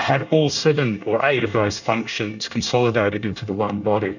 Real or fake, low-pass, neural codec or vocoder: fake; 7.2 kHz; codec, 24 kHz, 1 kbps, SNAC